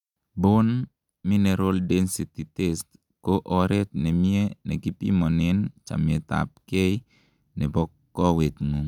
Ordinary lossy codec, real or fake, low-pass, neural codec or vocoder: none; real; 19.8 kHz; none